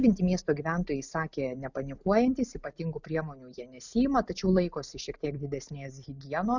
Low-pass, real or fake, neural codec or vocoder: 7.2 kHz; real; none